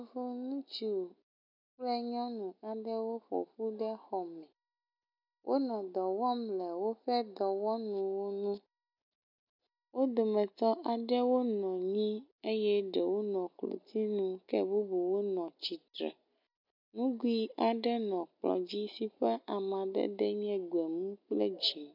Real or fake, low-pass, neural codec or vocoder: real; 5.4 kHz; none